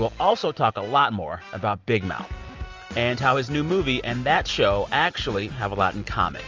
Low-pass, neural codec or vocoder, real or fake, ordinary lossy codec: 7.2 kHz; none; real; Opus, 32 kbps